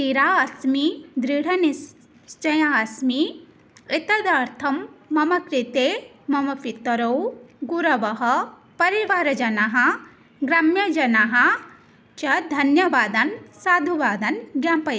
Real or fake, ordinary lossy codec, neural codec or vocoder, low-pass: real; none; none; none